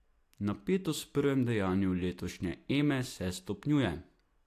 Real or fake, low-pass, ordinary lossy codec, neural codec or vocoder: real; 14.4 kHz; AAC, 64 kbps; none